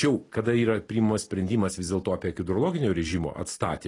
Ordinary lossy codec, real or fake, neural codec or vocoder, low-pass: AAC, 32 kbps; real; none; 10.8 kHz